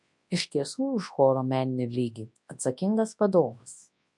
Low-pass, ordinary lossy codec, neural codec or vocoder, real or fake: 10.8 kHz; MP3, 64 kbps; codec, 24 kHz, 0.9 kbps, WavTokenizer, large speech release; fake